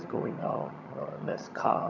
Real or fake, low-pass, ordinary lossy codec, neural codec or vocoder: fake; 7.2 kHz; none; vocoder, 22.05 kHz, 80 mel bands, HiFi-GAN